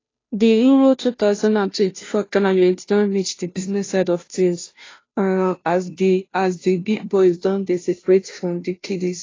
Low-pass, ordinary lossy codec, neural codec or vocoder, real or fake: 7.2 kHz; AAC, 32 kbps; codec, 16 kHz, 0.5 kbps, FunCodec, trained on Chinese and English, 25 frames a second; fake